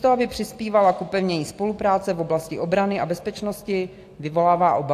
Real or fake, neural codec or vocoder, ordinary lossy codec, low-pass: real; none; AAC, 64 kbps; 14.4 kHz